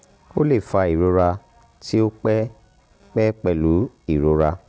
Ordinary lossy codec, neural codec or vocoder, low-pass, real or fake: none; none; none; real